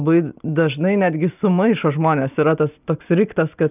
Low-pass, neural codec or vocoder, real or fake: 3.6 kHz; none; real